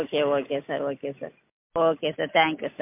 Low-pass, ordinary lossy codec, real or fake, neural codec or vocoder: 3.6 kHz; MP3, 32 kbps; real; none